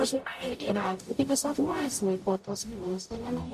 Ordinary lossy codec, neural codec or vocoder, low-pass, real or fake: MP3, 64 kbps; codec, 44.1 kHz, 0.9 kbps, DAC; 14.4 kHz; fake